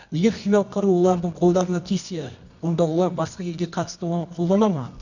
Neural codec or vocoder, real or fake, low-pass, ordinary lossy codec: codec, 24 kHz, 0.9 kbps, WavTokenizer, medium music audio release; fake; 7.2 kHz; none